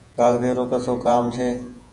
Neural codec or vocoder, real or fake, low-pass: vocoder, 48 kHz, 128 mel bands, Vocos; fake; 10.8 kHz